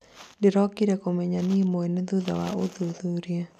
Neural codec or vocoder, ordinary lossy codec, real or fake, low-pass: none; none; real; 14.4 kHz